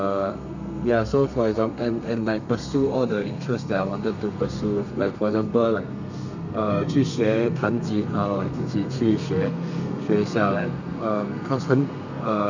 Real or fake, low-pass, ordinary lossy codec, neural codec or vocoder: fake; 7.2 kHz; none; codec, 32 kHz, 1.9 kbps, SNAC